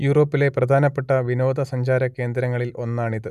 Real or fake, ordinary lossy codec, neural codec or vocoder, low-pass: real; none; none; 14.4 kHz